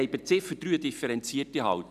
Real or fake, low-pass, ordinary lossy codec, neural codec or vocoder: real; 14.4 kHz; none; none